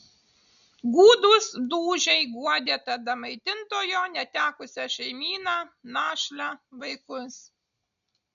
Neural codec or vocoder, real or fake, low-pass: none; real; 7.2 kHz